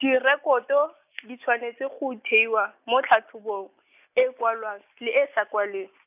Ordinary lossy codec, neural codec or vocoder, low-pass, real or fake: none; none; 3.6 kHz; real